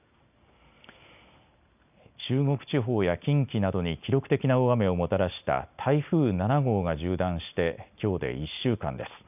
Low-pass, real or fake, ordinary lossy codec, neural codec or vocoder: 3.6 kHz; real; none; none